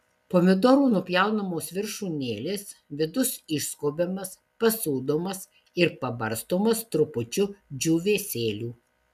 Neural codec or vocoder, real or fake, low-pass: none; real; 14.4 kHz